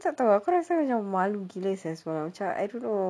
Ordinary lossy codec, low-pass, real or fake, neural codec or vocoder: none; none; real; none